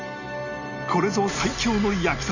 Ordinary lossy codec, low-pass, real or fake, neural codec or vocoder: none; 7.2 kHz; real; none